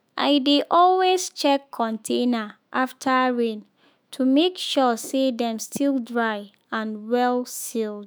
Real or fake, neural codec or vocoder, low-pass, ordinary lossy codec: fake; autoencoder, 48 kHz, 128 numbers a frame, DAC-VAE, trained on Japanese speech; none; none